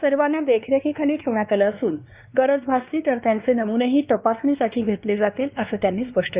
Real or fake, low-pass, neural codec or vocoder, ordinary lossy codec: fake; 3.6 kHz; codec, 16 kHz, 2 kbps, X-Codec, WavLM features, trained on Multilingual LibriSpeech; Opus, 64 kbps